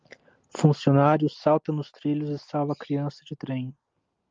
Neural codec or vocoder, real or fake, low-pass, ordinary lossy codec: none; real; 7.2 kHz; Opus, 32 kbps